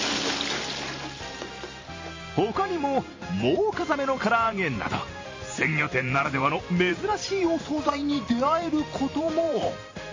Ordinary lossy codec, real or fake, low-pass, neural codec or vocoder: MP3, 32 kbps; fake; 7.2 kHz; vocoder, 44.1 kHz, 128 mel bands every 512 samples, BigVGAN v2